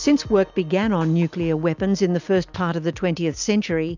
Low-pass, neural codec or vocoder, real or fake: 7.2 kHz; none; real